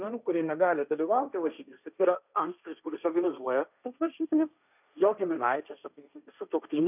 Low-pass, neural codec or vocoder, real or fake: 3.6 kHz; codec, 16 kHz, 1.1 kbps, Voila-Tokenizer; fake